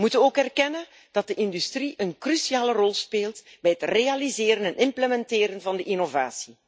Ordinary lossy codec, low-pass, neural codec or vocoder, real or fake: none; none; none; real